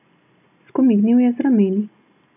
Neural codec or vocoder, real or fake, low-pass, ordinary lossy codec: none; real; 3.6 kHz; none